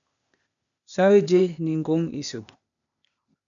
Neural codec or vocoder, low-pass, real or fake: codec, 16 kHz, 0.8 kbps, ZipCodec; 7.2 kHz; fake